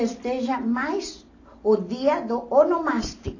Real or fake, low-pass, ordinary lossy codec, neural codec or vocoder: real; 7.2 kHz; AAC, 32 kbps; none